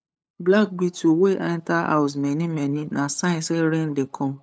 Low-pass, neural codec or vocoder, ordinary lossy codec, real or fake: none; codec, 16 kHz, 8 kbps, FunCodec, trained on LibriTTS, 25 frames a second; none; fake